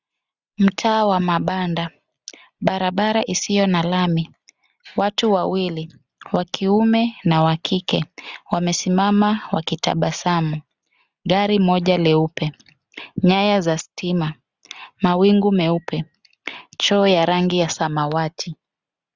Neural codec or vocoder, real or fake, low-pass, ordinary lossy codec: none; real; 7.2 kHz; Opus, 64 kbps